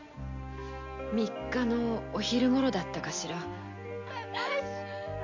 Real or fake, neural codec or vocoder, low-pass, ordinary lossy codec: real; none; 7.2 kHz; MP3, 48 kbps